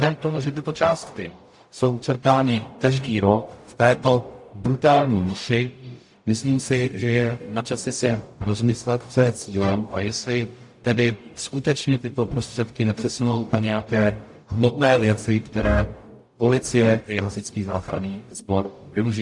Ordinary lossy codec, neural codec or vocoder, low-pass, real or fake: AAC, 64 kbps; codec, 44.1 kHz, 0.9 kbps, DAC; 10.8 kHz; fake